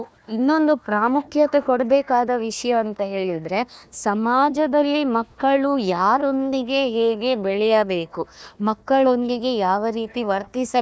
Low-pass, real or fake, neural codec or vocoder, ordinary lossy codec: none; fake; codec, 16 kHz, 1 kbps, FunCodec, trained on Chinese and English, 50 frames a second; none